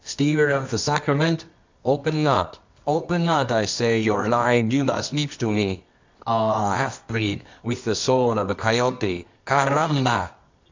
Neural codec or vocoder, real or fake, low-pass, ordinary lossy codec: codec, 24 kHz, 0.9 kbps, WavTokenizer, medium music audio release; fake; 7.2 kHz; MP3, 64 kbps